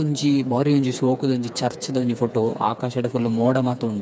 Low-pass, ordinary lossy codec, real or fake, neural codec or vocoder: none; none; fake; codec, 16 kHz, 4 kbps, FreqCodec, smaller model